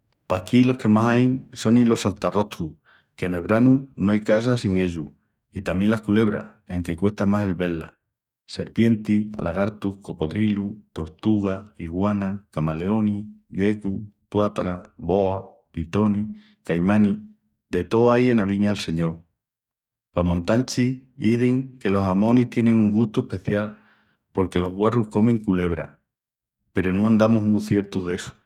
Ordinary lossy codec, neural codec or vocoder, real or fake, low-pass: none; codec, 44.1 kHz, 2.6 kbps, DAC; fake; 19.8 kHz